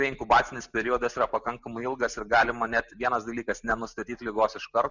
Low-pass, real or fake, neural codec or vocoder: 7.2 kHz; real; none